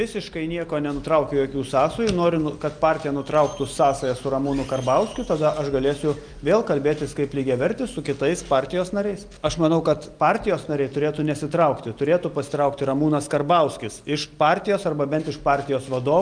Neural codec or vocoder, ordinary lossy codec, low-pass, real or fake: none; Opus, 32 kbps; 9.9 kHz; real